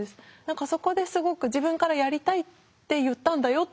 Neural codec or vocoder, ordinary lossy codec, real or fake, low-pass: none; none; real; none